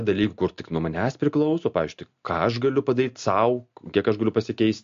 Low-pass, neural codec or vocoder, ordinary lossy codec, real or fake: 7.2 kHz; none; MP3, 48 kbps; real